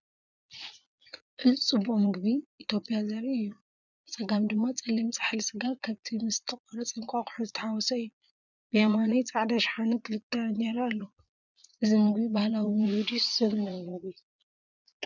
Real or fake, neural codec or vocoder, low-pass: fake; vocoder, 22.05 kHz, 80 mel bands, Vocos; 7.2 kHz